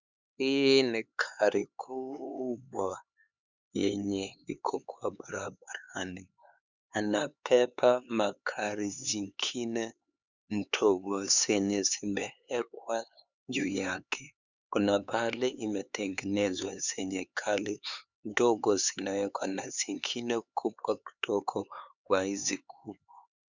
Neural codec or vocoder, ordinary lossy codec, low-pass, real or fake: codec, 16 kHz, 4 kbps, X-Codec, HuBERT features, trained on LibriSpeech; Opus, 64 kbps; 7.2 kHz; fake